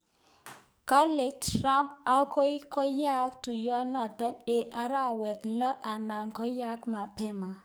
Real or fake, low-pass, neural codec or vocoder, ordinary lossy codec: fake; none; codec, 44.1 kHz, 2.6 kbps, SNAC; none